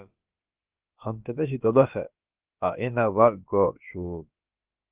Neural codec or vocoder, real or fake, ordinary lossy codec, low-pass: codec, 16 kHz, about 1 kbps, DyCAST, with the encoder's durations; fake; Opus, 32 kbps; 3.6 kHz